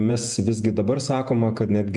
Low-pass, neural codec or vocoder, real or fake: 10.8 kHz; vocoder, 48 kHz, 128 mel bands, Vocos; fake